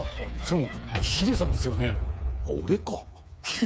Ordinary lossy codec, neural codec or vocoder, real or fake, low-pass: none; codec, 16 kHz, 4 kbps, FreqCodec, smaller model; fake; none